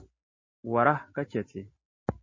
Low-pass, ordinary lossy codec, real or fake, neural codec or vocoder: 7.2 kHz; MP3, 32 kbps; real; none